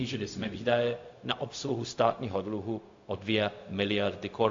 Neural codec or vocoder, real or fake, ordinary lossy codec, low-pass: codec, 16 kHz, 0.4 kbps, LongCat-Audio-Codec; fake; AAC, 48 kbps; 7.2 kHz